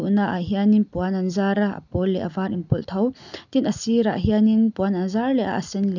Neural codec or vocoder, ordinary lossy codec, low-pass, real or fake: none; none; 7.2 kHz; real